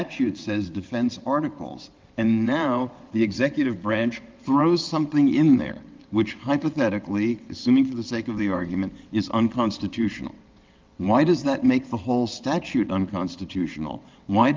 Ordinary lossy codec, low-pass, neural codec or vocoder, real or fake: Opus, 24 kbps; 7.2 kHz; vocoder, 22.05 kHz, 80 mel bands, WaveNeXt; fake